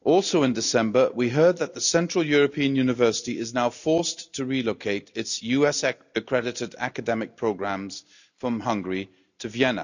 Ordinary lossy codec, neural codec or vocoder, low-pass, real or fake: none; none; 7.2 kHz; real